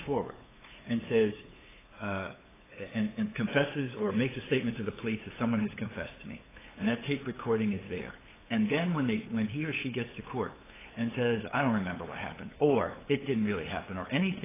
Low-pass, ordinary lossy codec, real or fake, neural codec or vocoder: 3.6 kHz; AAC, 16 kbps; fake; codec, 16 kHz, 8 kbps, FunCodec, trained on LibriTTS, 25 frames a second